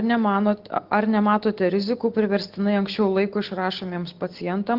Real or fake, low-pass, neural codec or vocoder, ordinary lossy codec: real; 5.4 kHz; none; Opus, 16 kbps